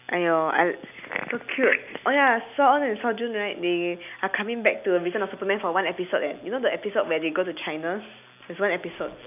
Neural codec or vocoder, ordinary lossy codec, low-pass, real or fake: none; none; 3.6 kHz; real